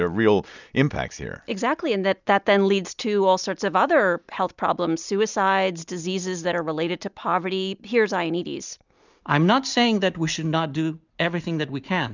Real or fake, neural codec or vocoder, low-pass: real; none; 7.2 kHz